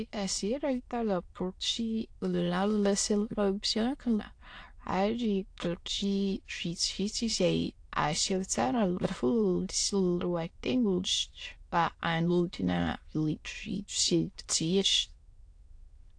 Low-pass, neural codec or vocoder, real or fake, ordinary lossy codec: 9.9 kHz; autoencoder, 22.05 kHz, a latent of 192 numbers a frame, VITS, trained on many speakers; fake; AAC, 48 kbps